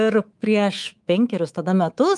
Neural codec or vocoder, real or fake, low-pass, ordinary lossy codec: codec, 24 kHz, 3.1 kbps, DualCodec; fake; 10.8 kHz; Opus, 32 kbps